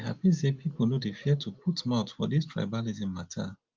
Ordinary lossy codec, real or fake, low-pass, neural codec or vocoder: Opus, 32 kbps; real; 7.2 kHz; none